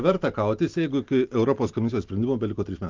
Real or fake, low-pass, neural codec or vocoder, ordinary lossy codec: real; 7.2 kHz; none; Opus, 32 kbps